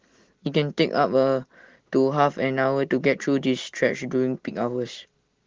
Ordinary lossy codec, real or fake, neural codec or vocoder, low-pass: Opus, 16 kbps; real; none; 7.2 kHz